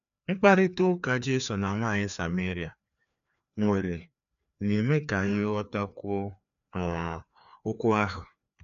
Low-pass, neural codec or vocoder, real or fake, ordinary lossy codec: 7.2 kHz; codec, 16 kHz, 2 kbps, FreqCodec, larger model; fake; none